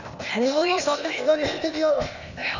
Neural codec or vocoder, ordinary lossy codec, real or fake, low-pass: codec, 16 kHz, 0.8 kbps, ZipCodec; none; fake; 7.2 kHz